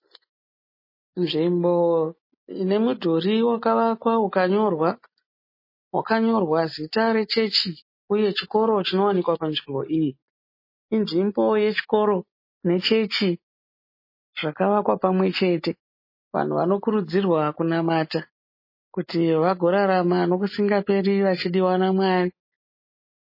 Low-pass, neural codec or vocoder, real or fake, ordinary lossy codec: 5.4 kHz; none; real; MP3, 24 kbps